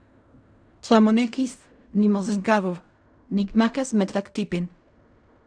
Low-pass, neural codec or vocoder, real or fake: 9.9 kHz; codec, 16 kHz in and 24 kHz out, 0.4 kbps, LongCat-Audio-Codec, fine tuned four codebook decoder; fake